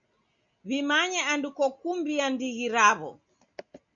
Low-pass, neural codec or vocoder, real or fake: 7.2 kHz; none; real